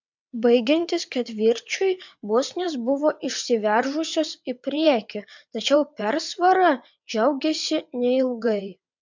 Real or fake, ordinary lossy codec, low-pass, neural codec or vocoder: fake; MP3, 64 kbps; 7.2 kHz; vocoder, 22.05 kHz, 80 mel bands, WaveNeXt